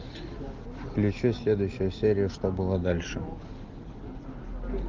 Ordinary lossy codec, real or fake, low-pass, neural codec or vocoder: Opus, 24 kbps; real; 7.2 kHz; none